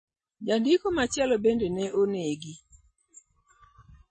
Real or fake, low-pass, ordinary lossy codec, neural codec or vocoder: real; 9.9 kHz; MP3, 32 kbps; none